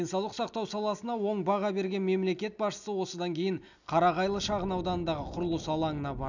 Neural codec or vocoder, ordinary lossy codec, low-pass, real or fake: none; none; 7.2 kHz; real